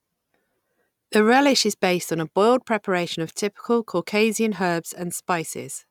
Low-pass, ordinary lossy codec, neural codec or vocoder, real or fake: 19.8 kHz; none; none; real